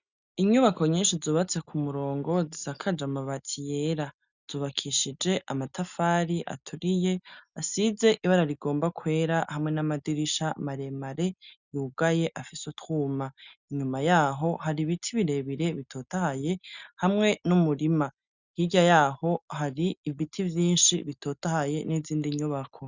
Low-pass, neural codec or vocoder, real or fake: 7.2 kHz; none; real